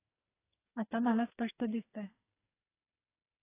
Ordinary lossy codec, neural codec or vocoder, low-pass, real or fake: AAC, 24 kbps; codec, 44.1 kHz, 2.6 kbps, SNAC; 3.6 kHz; fake